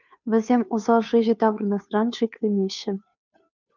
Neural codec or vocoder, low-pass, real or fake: codec, 16 kHz, 2 kbps, FunCodec, trained on Chinese and English, 25 frames a second; 7.2 kHz; fake